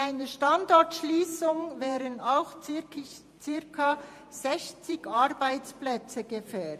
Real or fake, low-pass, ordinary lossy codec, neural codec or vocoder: fake; 14.4 kHz; MP3, 64 kbps; vocoder, 48 kHz, 128 mel bands, Vocos